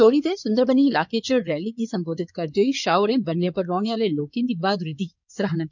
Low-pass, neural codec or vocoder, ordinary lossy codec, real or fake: 7.2 kHz; codec, 16 kHz in and 24 kHz out, 2.2 kbps, FireRedTTS-2 codec; none; fake